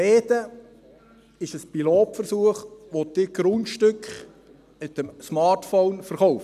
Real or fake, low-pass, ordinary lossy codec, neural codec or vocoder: real; 14.4 kHz; none; none